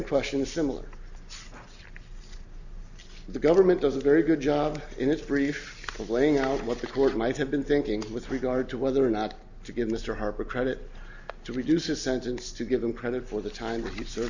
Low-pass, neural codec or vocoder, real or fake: 7.2 kHz; none; real